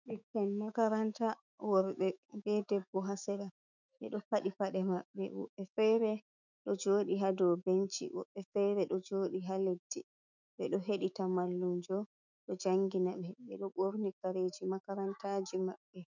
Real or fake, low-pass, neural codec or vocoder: fake; 7.2 kHz; autoencoder, 48 kHz, 128 numbers a frame, DAC-VAE, trained on Japanese speech